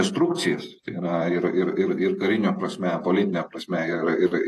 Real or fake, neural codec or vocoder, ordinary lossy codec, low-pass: fake; vocoder, 48 kHz, 128 mel bands, Vocos; AAC, 48 kbps; 14.4 kHz